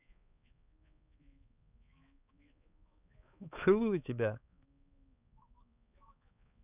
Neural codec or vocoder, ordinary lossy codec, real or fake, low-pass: codec, 16 kHz, 4 kbps, X-Codec, HuBERT features, trained on balanced general audio; none; fake; 3.6 kHz